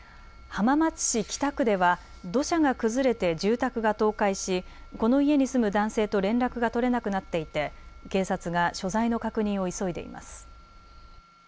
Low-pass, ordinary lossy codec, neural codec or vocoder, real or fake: none; none; none; real